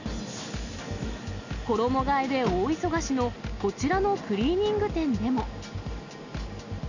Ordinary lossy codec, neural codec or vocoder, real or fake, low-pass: none; none; real; 7.2 kHz